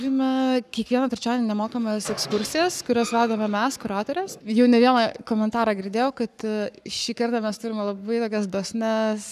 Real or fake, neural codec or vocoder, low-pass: fake; codec, 44.1 kHz, 7.8 kbps, Pupu-Codec; 14.4 kHz